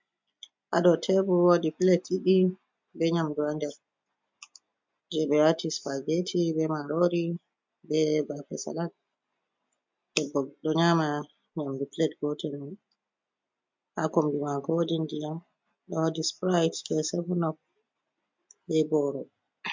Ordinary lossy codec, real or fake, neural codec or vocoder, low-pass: MP3, 64 kbps; real; none; 7.2 kHz